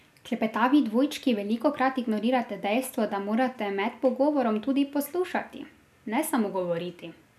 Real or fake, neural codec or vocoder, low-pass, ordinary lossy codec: real; none; 14.4 kHz; none